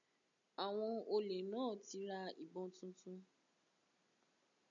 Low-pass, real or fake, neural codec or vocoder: 7.2 kHz; real; none